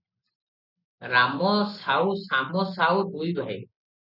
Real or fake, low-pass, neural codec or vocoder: real; 5.4 kHz; none